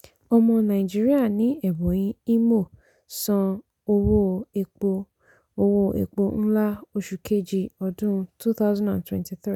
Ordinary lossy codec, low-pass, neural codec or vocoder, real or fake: none; 19.8 kHz; none; real